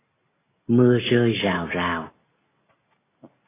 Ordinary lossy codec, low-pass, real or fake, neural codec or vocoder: AAC, 16 kbps; 3.6 kHz; real; none